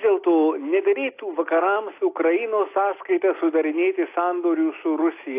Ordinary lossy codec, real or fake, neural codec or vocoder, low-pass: AAC, 24 kbps; real; none; 3.6 kHz